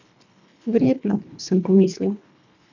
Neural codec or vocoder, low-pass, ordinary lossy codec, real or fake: codec, 24 kHz, 1.5 kbps, HILCodec; 7.2 kHz; none; fake